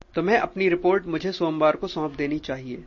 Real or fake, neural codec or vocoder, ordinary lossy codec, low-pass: real; none; MP3, 32 kbps; 7.2 kHz